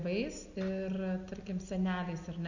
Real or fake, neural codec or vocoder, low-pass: real; none; 7.2 kHz